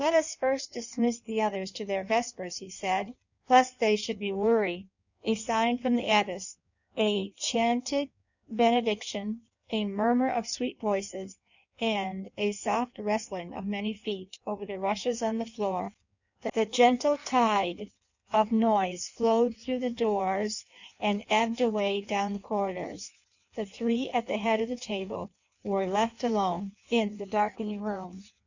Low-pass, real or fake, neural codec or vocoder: 7.2 kHz; fake; codec, 16 kHz in and 24 kHz out, 1.1 kbps, FireRedTTS-2 codec